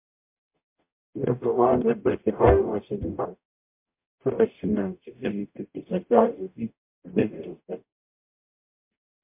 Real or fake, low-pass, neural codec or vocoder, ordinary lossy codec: fake; 3.6 kHz; codec, 44.1 kHz, 0.9 kbps, DAC; MP3, 32 kbps